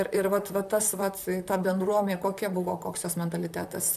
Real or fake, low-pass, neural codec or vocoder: fake; 14.4 kHz; vocoder, 44.1 kHz, 128 mel bands, Pupu-Vocoder